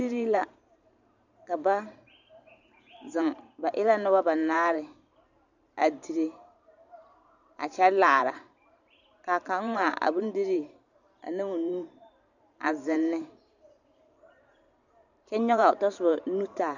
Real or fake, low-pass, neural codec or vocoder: fake; 7.2 kHz; vocoder, 44.1 kHz, 128 mel bands every 512 samples, BigVGAN v2